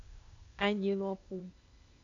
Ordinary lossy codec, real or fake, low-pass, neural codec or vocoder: MP3, 96 kbps; fake; 7.2 kHz; codec, 16 kHz, 0.8 kbps, ZipCodec